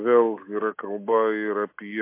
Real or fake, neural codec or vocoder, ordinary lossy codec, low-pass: real; none; MP3, 24 kbps; 3.6 kHz